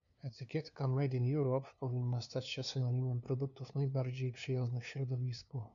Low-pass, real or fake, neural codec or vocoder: 7.2 kHz; fake; codec, 16 kHz, 2 kbps, FunCodec, trained on LibriTTS, 25 frames a second